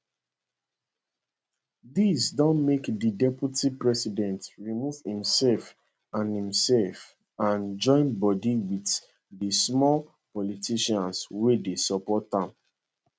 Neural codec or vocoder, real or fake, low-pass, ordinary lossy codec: none; real; none; none